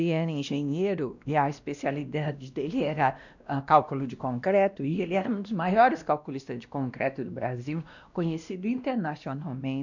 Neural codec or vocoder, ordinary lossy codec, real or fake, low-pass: codec, 16 kHz, 1 kbps, X-Codec, WavLM features, trained on Multilingual LibriSpeech; none; fake; 7.2 kHz